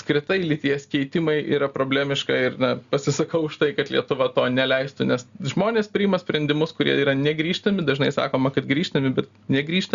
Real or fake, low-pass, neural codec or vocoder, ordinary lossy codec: real; 7.2 kHz; none; Opus, 64 kbps